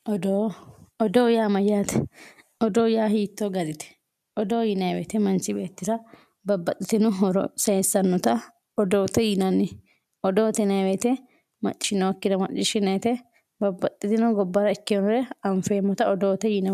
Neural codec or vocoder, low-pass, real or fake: none; 14.4 kHz; real